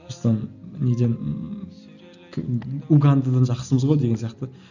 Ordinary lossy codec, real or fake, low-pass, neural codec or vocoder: none; real; 7.2 kHz; none